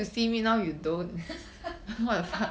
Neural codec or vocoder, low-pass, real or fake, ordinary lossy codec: none; none; real; none